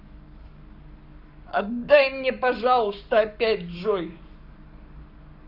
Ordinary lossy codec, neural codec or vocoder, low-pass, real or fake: none; codec, 44.1 kHz, 7.8 kbps, Pupu-Codec; 5.4 kHz; fake